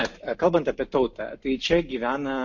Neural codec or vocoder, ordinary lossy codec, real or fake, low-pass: none; MP3, 48 kbps; real; 7.2 kHz